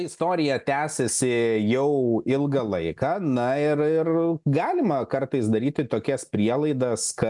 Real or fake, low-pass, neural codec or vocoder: real; 10.8 kHz; none